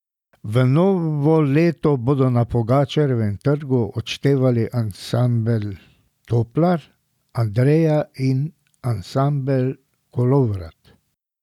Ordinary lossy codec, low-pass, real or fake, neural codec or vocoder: none; 19.8 kHz; real; none